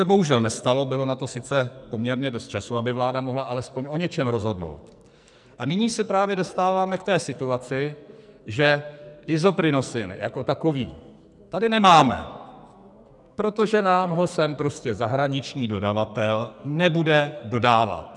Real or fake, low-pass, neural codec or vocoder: fake; 10.8 kHz; codec, 44.1 kHz, 2.6 kbps, SNAC